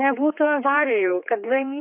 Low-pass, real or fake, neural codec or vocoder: 3.6 kHz; fake; codec, 16 kHz, 4 kbps, X-Codec, HuBERT features, trained on general audio